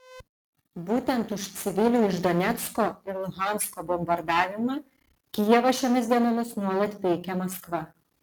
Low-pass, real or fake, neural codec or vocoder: 19.8 kHz; real; none